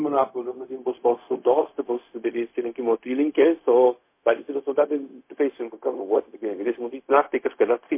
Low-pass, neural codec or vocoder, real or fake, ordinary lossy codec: 3.6 kHz; codec, 16 kHz, 0.4 kbps, LongCat-Audio-Codec; fake; MP3, 24 kbps